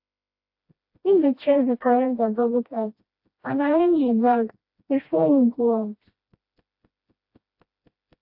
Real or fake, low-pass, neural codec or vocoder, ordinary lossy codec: fake; 5.4 kHz; codec, 16 kHz, 1 kbps, FreqCodec, smaller model; AAC, 48 kbps